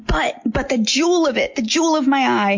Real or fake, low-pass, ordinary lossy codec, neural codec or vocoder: real; 7.2 kHz; MP3, 48 kbps; none